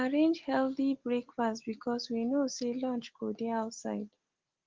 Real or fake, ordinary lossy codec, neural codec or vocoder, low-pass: real; Opus, 16 kbps; none; 7.2 kHz